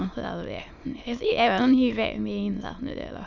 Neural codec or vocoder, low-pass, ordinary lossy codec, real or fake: autoencoder, 22.05 kHz, a latent of 192 numbers a frame, VITS, trained on many speakers; 7.2 kHz; none; fake